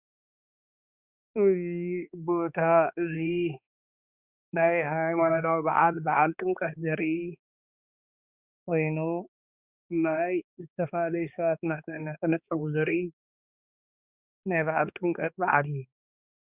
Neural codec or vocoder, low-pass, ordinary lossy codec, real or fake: codec, 16 kHz, 2 kbps, X-Codec, HuBERT features, trained on balanced general audio; 3.6 kHz; Opus, 64 kbps; fake